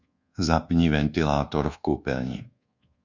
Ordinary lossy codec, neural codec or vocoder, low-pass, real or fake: Opus, 64 kbps; codec, 24 kHz, 1.2 kbps, DualCodec; 7.2 kHz; fake